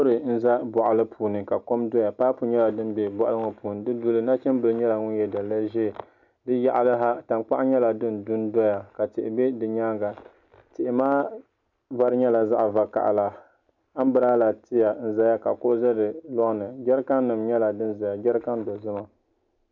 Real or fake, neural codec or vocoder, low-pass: real; none; 7.2 kHz